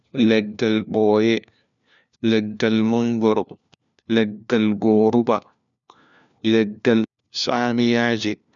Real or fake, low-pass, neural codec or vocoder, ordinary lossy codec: fake; 7.2 kHz; codec, 16 kHz, 1 kbps, FunCodec, trained on LibriTTS, 50 frames a second; none